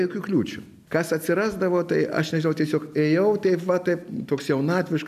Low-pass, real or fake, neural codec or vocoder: 14.4 kHz; real; none